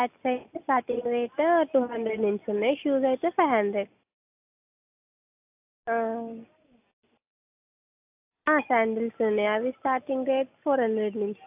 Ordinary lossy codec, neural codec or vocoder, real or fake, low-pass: AAC, 32 kbps; none; real; 3.6 kHz